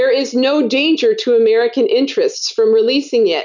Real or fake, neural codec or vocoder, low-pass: real; none; 7.2 kHz